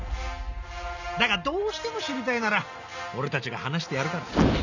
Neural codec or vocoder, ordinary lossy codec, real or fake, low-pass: none; none; real; 7.2 kHz